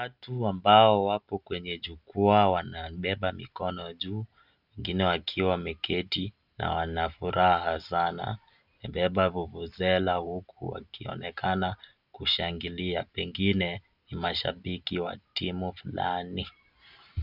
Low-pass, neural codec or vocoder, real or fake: 5.4 kHz; none; real